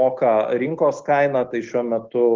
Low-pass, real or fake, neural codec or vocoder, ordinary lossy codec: 7.2 kHz; real; none; Opus, 16 kbps